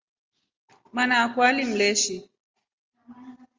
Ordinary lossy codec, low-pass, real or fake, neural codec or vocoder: Opus, 32 kbps; 7.2 kHz; real; none